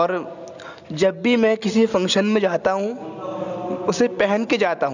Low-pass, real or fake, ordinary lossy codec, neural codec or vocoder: 7.2 kHz; real; none; none